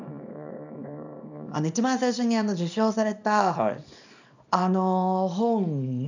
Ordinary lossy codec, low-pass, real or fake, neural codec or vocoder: none; 7.2 kHz; fake; codec, 24 kHz, 0.9 kbps, WavTokenizer, small release